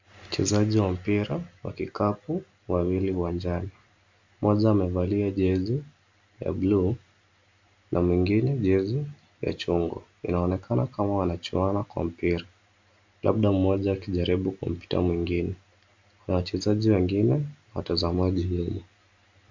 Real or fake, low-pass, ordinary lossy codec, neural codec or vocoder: real; 7.2 kHz; MP3, 64 kbps; none